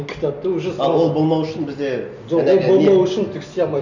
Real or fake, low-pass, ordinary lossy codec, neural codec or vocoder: real; 7.2 kHz; none; none